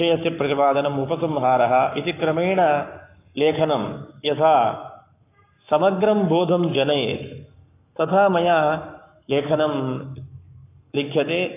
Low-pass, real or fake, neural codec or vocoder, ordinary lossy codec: 3.6 kHz; fake; codec, 44.1 kHz, 7.8 kbps, Pupu-Codec; AAC, 24 kbps